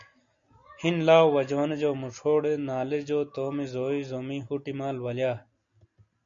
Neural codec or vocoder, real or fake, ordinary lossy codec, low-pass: none; real; MP3, 64 kbps; 7.2 kHz